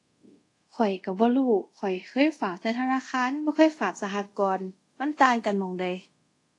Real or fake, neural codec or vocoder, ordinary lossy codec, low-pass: fake; codec, 24 kHz, 0.5 kbps, DualCodec; AAC, 48 kbps; 10.8 kHz